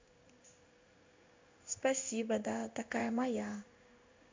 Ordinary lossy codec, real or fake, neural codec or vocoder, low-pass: MP3, 48 kbps; fake; codec, 16 kHz in and 24 kHz out, 1 kbps, XY-Tokenizer; 7.2 kHz